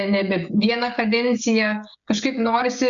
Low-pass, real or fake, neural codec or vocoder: 7.2 kHz; fake; codec, 16 kHz, 16 kbps, FreqCodec, smaller model